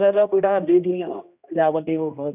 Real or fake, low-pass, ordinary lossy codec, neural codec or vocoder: fake; 3.6 kHz; none; codec, 16 kHz, 1 kbps, X-Codec, HuBERT features, trained on general audio